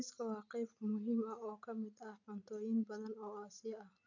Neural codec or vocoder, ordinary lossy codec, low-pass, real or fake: none; none; 7.2 kHz; real